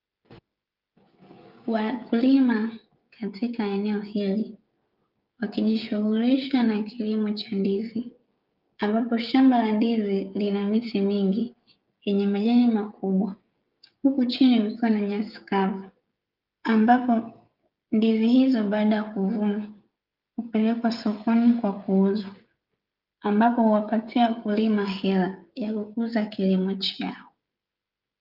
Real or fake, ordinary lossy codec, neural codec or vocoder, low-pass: fake; Opus, 32 kbps; codec, 16 kHz, 16 kbps, FreqCodec, smaller model; 5.4 kHz